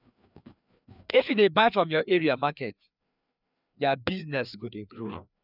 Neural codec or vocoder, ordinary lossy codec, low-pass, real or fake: codec, 16 kHz, 2 kbps, FreqCodec, larger model; none; 5.4 kHz; fake